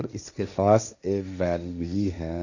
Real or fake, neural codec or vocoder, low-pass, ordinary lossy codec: fake; codec, 16 kHz, 1.1 kbps, Voila-Tokenizer; 7.2 kHz; none